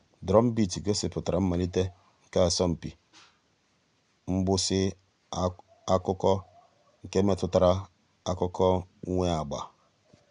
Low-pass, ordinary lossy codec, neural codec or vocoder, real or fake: 9.9 kHz; none; none; real